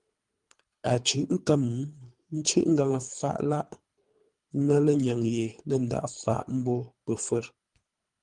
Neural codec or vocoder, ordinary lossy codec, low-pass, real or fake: codec, 24 kHz, 3 kbps, HILCodec; Opus, 32 kbps; 10.8 kHz; fake